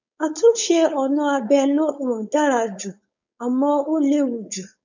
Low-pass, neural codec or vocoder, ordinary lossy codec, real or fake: 7.2 kHz; codec, 16 kHz, 4.8 kbps, FACodec; none; fake